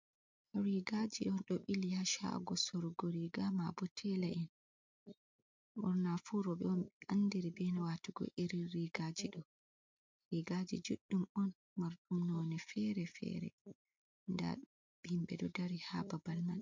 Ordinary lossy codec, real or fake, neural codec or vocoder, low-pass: MP3, 64 kbps; real; none; 7.2 kHz